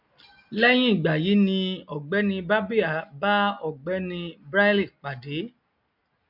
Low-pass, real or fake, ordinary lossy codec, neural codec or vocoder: 5.4 kHz; real; MP3, 48 kbps; none